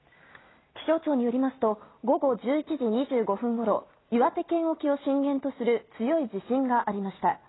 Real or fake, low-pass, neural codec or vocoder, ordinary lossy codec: real; 7.2 kHz; none; AAC, 16 kbps